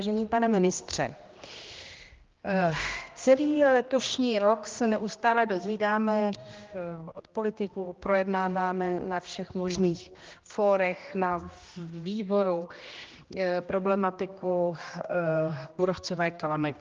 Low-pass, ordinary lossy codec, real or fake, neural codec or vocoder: 7.2 kHz; Opus, 32 kbps; fake; codec, 16 kHz, 1 kbps, X-Codec, HuBERT features, trained on general audio